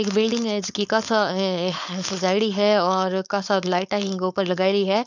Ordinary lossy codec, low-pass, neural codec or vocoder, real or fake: none; 7.2 kHz; codec, 16 kHz, 4.8 kbps, FACodec; fake